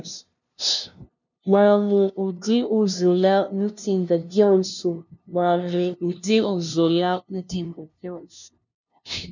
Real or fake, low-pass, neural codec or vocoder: fake; 7.2 kHz; codec, 16 kHz, 0.5 kbps, FunCodec, trained on LibriTTS, 25 frames a second